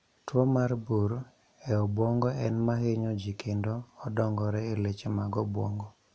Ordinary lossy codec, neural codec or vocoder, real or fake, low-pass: none; none; real; none